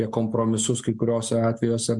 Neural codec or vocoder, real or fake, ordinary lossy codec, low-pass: none; real; MP3, 96 kbps; 10.8 kHz